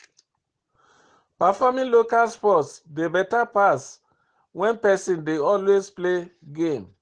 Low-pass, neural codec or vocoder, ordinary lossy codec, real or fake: 9.9 kHz; none; Opus, 16 kbps; real